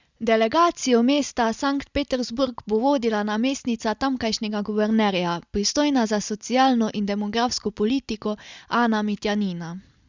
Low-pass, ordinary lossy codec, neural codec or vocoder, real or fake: 7.2 kHz; Opus, 64 kbps; none; real